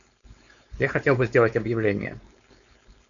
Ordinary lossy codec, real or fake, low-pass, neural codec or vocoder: MP3, 96 kbps; fake; 7.2 kHz; codec, 16 kHz, 4.8 kbps, FACodec